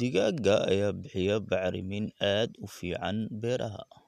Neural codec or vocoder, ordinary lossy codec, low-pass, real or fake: none; none; 14.4 kHz; real